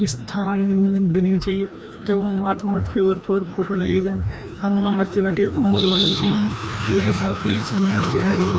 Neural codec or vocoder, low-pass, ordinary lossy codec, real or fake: codec, 16 kHz, 1 kbps, FreqCodec, larger model; none; none; fake